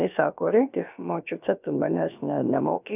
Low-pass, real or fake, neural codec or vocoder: 3.6 kHz; fake; codec, 16 kHz, about 1 kbps, DyCAST, with the encoder's durations